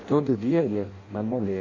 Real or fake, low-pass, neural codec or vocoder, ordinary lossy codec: fake; 7.2 kHz; codec, 16 kHz in and 24 kHz out, 0.6 kbps, FireRedTTS-2 codec; MP3, 32 kbps